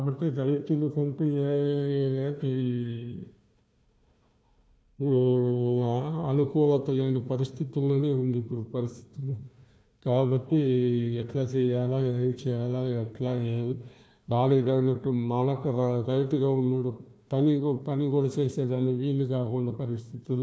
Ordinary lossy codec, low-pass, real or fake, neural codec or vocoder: none; none; fake; codec, 16 kHz, 1 kbps, FunCodec, trained on Chinese and English, 50 frames a second